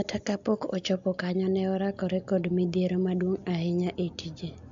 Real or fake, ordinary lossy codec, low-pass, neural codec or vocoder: real; AAC, 64 kbps; 7.2 kHz; none